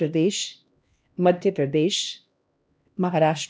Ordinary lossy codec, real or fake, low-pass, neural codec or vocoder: none; fake; none; codec, 16 kHz, 1 kbps, X-Codec, HuBERT features, trained on LibriSpeech